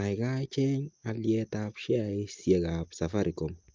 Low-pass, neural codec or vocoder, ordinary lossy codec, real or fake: 7.2 kHz; none; Opus, 32 kbps; real